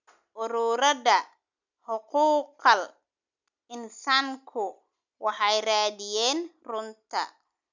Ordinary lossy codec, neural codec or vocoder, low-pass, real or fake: none; none; 7.2 kHz; real